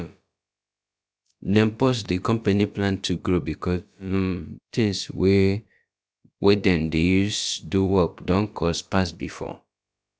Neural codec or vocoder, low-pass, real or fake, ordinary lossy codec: codec, 16 kHz, about 1 kbps, DyCAST, with the encoder's durations; none; fake; none